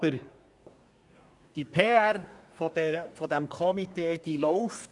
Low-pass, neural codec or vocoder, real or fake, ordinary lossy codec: 10.8 kHz; codec, 24 kHz, 1 kbps, SNAC; fake; none